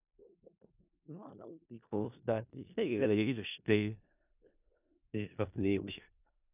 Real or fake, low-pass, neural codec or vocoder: fake; 3.6 kHz; codec, 16 kHz in and 24 kHz out, 0.4 kbps, LongCat-Audio-Codec, four codebook decoder